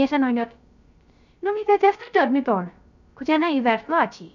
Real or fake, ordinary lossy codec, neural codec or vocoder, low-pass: fake; none; codec, 16 kHz, 0.3 kbps, FocalCodec; 7.2 kHz